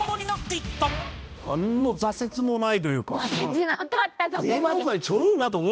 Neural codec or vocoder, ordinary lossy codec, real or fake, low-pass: codec, 16 kHz, 1 kbps, X-Codec, HuBERT features, trained on balanced general audio; none; fake; none